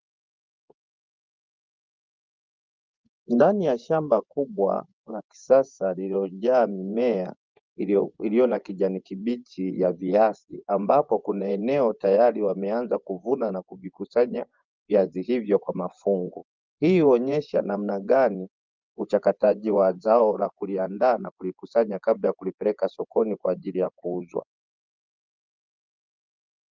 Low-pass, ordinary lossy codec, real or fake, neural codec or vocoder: 7.2 kHz; Opus, 24 kbps; fake; vocoder, 24 kHz, 100 mel bands, Vocos